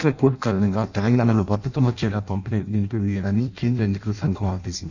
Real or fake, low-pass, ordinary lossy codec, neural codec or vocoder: fake; 7.2 kHz; none; codec, 16 kHz in and 24 kHz out, 0.6 kbps, FireRedTTS-2 codec